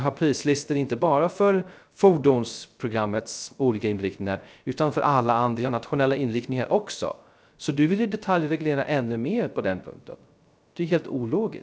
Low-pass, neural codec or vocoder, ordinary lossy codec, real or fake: none; codec, 16 kHz, 0.3 kbps, FocalCodec; none; fake